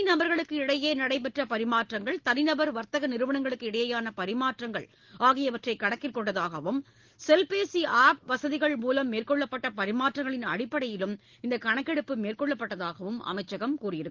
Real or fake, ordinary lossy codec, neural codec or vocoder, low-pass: real; Opus, 16 kbps; none; 7.2 kHz